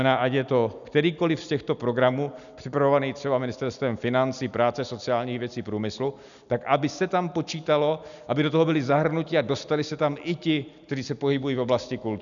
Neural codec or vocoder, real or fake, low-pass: none; real; 7.2 kHz